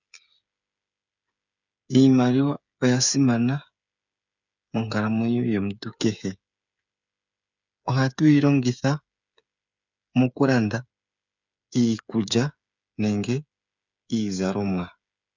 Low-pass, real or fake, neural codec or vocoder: 7.2 kHz; fake; codec, 16 kHz, 8 kbps, FreqCodec, smaller model